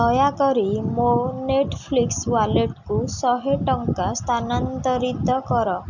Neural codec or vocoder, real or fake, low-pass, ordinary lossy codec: none; real; 7.2 kHz; none